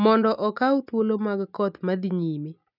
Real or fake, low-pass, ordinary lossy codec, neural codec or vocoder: real; 5.4 kHz; none; none